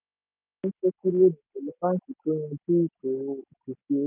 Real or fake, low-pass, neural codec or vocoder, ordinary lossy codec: real; 3.6 kHz; none; none